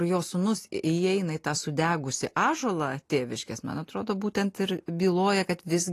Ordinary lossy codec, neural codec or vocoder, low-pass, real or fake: AAC, 48 kbps; none; 14.4 kHz; real